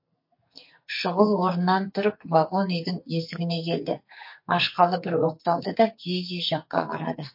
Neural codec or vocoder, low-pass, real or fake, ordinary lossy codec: codec, 44.1 kHz, 2.6 kbps, SNAC; 5.4 kHz; fake; MP3, 32 kbps